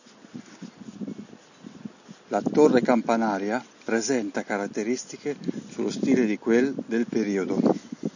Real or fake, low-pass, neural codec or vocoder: real; 7.2 kHz; none